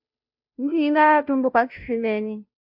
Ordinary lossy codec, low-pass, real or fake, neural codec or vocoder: AAC, 48 kbps; 5.4 kHz; fake; codec, 16 kHz, 0.5 kbps, FunCodec, trained on Chinese and English, 25 frames a second